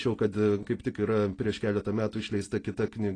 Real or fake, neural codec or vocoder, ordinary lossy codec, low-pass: real; none; AAC, 32 kbps; 9.9 kHz